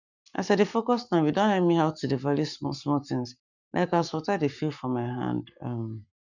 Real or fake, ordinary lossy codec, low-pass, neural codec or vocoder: fake; none; 7.2 kHz; codec, 44.1 kHz, 7.8 kbps, Pupu-Codec